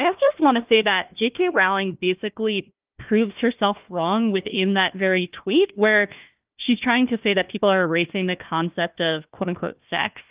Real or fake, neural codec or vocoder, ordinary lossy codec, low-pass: fake; codec, 16 kHz, 1 kbps, FunCodec, trained on Chinese and English, 50 frames a second; Opus, 24 kbps; 3.6 kHz